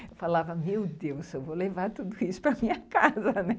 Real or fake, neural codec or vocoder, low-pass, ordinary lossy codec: real; none; none; none